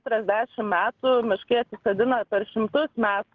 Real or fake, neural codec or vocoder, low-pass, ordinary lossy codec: real; none; 7.2 kHz; Opus, 16 kbps